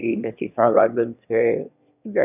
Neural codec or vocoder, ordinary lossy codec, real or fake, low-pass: autoencoder, 22.05 kHz, a latent of 192 numbers a frame, VITS, trained on one speaker; none; fake; 3.6 kHz